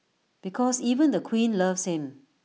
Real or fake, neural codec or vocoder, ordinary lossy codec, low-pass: real; none; none; none